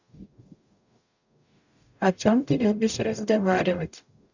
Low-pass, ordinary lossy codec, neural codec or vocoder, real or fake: 7.2 kHz; none; codec, 44.1 kHz, 0.9 kbps, DAC; fake